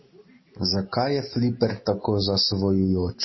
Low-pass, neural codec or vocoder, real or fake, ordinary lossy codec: 7.2 kHz; none; real; MP3, 24 kbps